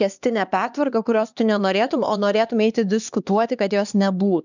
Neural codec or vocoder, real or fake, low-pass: codec, 16 kHz, 2 kbps, X-Codec, HuBERT features, trained on LibriSpeech; fake; 7.2 kHz